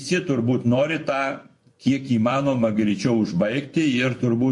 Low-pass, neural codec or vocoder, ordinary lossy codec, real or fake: 10.8 kHz; vocoder, 24 kHz, 100 mel bands, Vocos; MP3, 48 kbps; fake